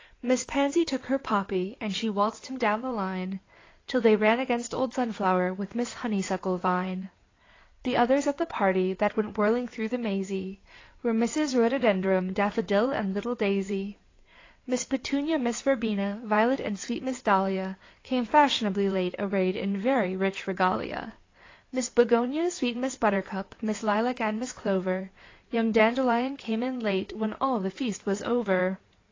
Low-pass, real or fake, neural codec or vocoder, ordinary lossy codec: 7.2 kHz; fake; codec, 16 kHz in and 24 kHz out, 2.2 kbps, FireRedTTS-2 codec; AAC, 32 kbps